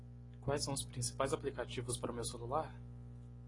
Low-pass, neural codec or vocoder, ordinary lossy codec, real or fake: 10.8 kHz; none; AAC, 32 kbps; real